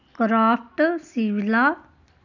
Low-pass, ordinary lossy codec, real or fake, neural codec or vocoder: 7.2 kHz; none; real; none